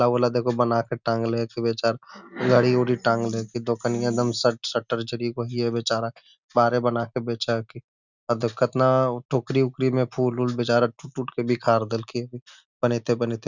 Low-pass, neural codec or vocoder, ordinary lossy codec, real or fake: 7.2 kHz; none; none; real